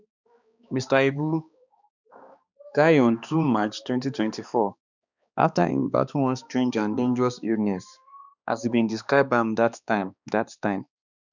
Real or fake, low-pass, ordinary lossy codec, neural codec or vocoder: fake; 7.2 kHz; none; codec, 16 kHz, 2 kbps, X-Codec, HuBERT features, trained on balanced general audio